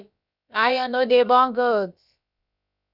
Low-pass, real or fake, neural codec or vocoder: 5.4 kHz; fake; codec, 16 kHz, about 1 kbps, DyCAST, with the encoder's durations